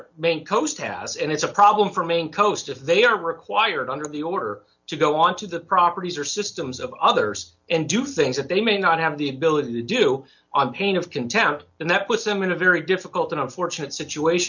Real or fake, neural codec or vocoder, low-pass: real; none; 7.2 kHz